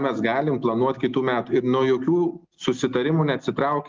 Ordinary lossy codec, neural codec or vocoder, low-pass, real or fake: Opus, 32 kbps; none; 7.2 kHz; real